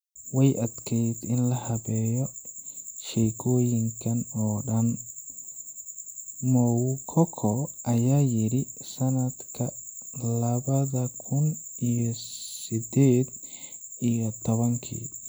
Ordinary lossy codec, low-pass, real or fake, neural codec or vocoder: none; none; real; none